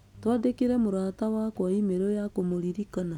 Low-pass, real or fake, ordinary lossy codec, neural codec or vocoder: 19.8 kHz; real; none; none